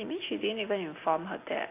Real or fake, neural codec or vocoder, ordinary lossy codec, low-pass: real; none; AAC, 24 kbps; 3.6 kHz